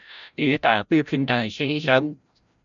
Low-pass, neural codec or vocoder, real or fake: 7.2 kHz; codec, 16 kHz, 0.5 kbps, FreqCodec, larger model; fake